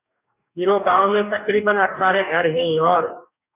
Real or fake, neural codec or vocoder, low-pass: fake; codec, 44.1 kHz, 2.6 kbps, DAC; 3.6 kHz